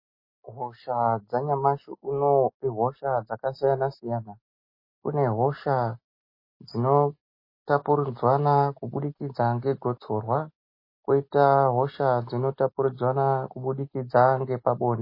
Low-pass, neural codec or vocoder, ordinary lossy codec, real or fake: 5.4 kHz; none; MP3, 24 kbps; real